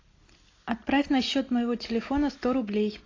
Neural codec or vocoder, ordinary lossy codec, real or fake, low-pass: none; AAC, 32 kbps; real; 7.2 kHz